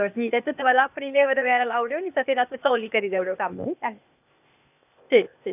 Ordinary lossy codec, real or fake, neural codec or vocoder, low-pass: none; fake; codec, 16 kHz, 0.8 kbps, ZipCodec; 3.6 kHz